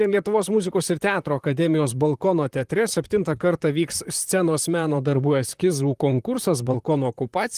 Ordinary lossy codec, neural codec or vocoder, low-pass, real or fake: Opus, 24 kbps; vocoder, 44.1 kHz, 128 mel bands, Pupu-Vocoder; 14.4 kHz; fake